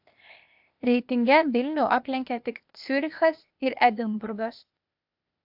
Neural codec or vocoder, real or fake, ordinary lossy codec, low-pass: codec, 16 kHz, 0.8 kbps, ZipCodec; fake; AAC, 48 kbps; 5.4 kHz